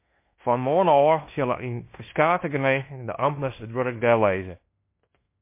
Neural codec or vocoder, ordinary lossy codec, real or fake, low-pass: codec, 16 kHz in and 24 kHz out, 0.9 kbps, LongCat-Audio-Codec, fine tuned four codebook decoder; MP3, 24 kbps; fake; 3.6 kHz